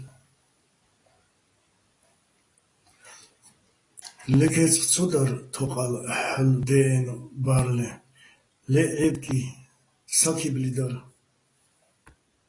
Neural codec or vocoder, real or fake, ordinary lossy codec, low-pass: none; real; AAC, 32 kbps; 10.8 kHz